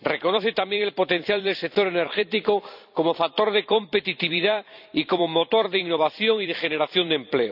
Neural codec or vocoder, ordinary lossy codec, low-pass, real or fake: vocoder, 44.1 kHz, 128 mel bands every 256 samples, BigVGAN v2; none; 5.4 kHz; fake